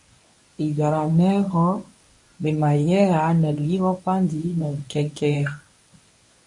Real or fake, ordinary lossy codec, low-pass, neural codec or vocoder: fake; MP3, 48 kbps; 10.8 kHz; codec, 24 kHz, 0.9 kbps, WavTokenizer, medium speech release version 1